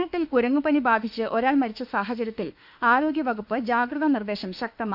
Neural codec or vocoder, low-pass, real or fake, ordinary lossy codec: autoencoder, 48 kHz, 32 numbers a frame, DAC-VAE, trained on Japanese speech; 5.4 kHz; fake; AAC, 48 kbps